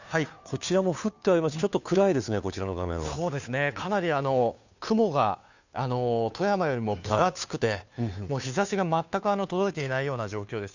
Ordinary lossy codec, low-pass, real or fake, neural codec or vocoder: none; 7.2 kHz; fake; codec, 16 kHz, 2 kbps, FunCodec, trained on Chinese and English, 25 frames a second